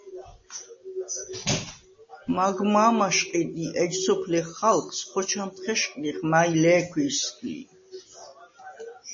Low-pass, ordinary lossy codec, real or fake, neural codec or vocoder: 7.2 kHz; MP3, 32 kbps; real; none